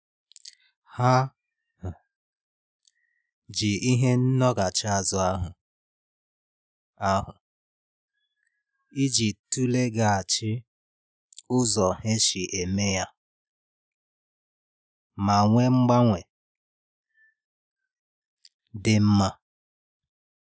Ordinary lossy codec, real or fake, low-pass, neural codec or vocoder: none; real; none; none